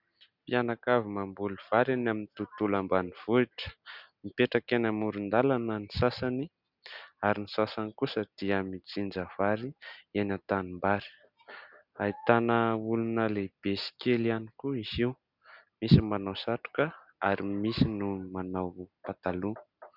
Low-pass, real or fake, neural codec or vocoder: 5.4 kHz; real; none